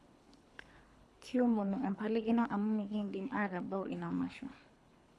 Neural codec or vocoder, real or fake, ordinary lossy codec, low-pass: codec, 24 kHz, 3 kbps, HILCodec; fake; none; none